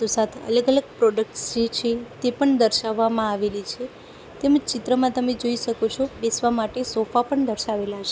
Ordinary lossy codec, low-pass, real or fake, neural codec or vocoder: none; none; real; none